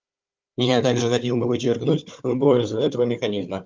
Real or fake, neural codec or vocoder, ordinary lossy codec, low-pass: fake; codec, 16 kHz, 4 kbps, FunCodec, trained on Chinese and English, 50 frames a second; Opus, 24 kbps; 7.2 kHz